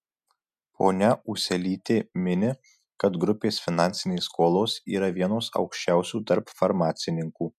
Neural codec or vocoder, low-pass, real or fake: none; 14.4 kHz; real